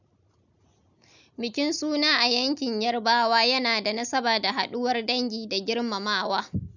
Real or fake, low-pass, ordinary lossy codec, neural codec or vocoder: real; 7.2 kHz; none; none